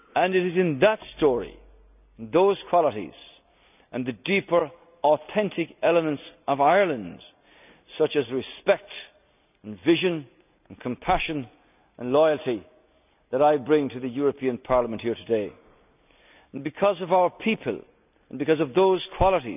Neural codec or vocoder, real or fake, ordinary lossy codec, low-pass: none; real; none; 3.6 kHz